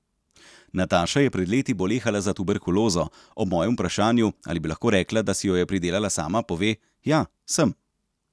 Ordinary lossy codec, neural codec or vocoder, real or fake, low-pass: none; none; real; none